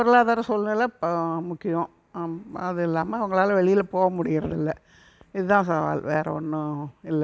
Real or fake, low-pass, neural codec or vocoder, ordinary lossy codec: real; none; none; none